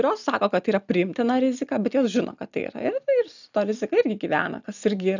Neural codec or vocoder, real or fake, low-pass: none; real; 7.2 kHz